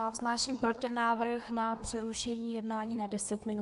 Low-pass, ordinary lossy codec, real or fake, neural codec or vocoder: 10.8 kHz; AAC, 96 kbps; fake; codec, 24 kHz, 1 kbps, SNAC